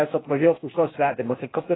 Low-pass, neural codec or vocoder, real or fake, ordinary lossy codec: 7.2 kHz; codec, 16 kHz, 0.8 kbps, ZipCodec; fake; AAC, 16 kbps